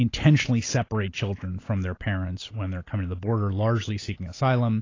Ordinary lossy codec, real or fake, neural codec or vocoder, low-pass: AAC, 32 kbps; real; none; 7.2 kHz